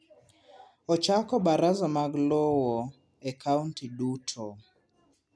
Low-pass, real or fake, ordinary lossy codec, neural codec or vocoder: none; real; none; none